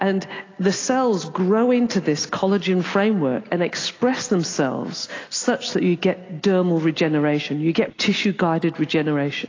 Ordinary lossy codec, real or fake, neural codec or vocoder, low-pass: AAC, 32 kbps; real; none; 7.2 kHz